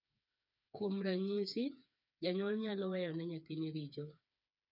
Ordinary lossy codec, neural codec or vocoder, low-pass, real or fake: none; codec, 16 kHz, 4 kbps, FreqCodec, smaller model; 5.4 kHz; fake